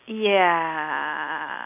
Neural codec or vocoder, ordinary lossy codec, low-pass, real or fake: none; none; 3.6 kHz; real